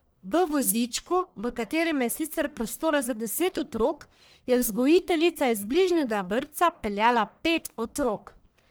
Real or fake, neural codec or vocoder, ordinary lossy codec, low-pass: fake; codec, 44.1 kHz, 1.7 kbps, Pupu-Codec; none; none